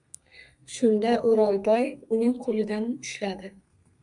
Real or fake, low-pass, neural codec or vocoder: fake; 10.8 kHz; codec, 32 kHz, 1.9 kbps, SNAC